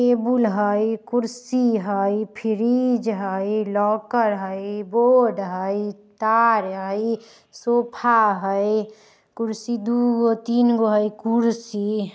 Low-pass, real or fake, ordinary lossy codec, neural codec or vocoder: none; real; none; none